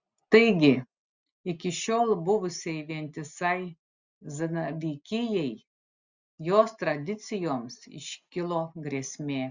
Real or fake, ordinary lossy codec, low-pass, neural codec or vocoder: real; Opus, 64 kbps; 7.2 kHz; none